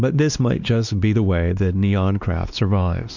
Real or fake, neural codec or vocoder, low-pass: fake; codec, 16 kHz, 2 kbps, X-Codec, WavLM features, trained on Multilingual LibriSpeech; 7.2 kHz